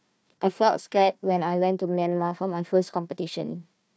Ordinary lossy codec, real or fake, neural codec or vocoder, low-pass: none; fake; codec, 16 kHz, 1 kbps, FunCodec, trained on Chinese and English, 50 frames a second; none